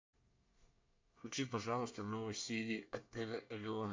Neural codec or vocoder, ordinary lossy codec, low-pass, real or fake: codec, 24 kHz, 1 kbps, SNAC; MP3, 64 kbps; 7.2 kHz; fake